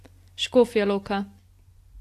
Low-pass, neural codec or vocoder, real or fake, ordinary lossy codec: 14.4 kHz; none; real; AAC, 64 kbps